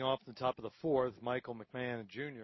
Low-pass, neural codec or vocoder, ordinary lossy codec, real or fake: 7.2 kHz; none; MP3, 24 kbps; real